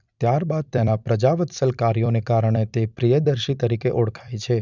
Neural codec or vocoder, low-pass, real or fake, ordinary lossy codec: vocoder, 44.1 kHz, 128 mel bands every 256 samples, BigVGAN v2; 7.2 kHz; fake; none